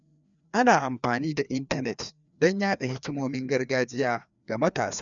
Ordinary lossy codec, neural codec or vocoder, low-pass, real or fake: MP3, 96 kbps; codec, 16 kHz, 2 kbps, FreqCodec, larger model; 7.2 kHz; fake